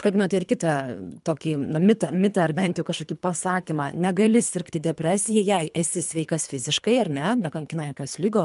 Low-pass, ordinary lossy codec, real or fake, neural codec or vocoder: 10.8 kHz; MP3, 96 kbps; fake; codec, 24 kHz, 3 kbps, HILCodec